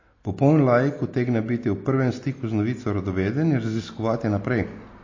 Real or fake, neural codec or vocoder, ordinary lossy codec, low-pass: real; none; MP3, 32 kbps; 7.2 kHz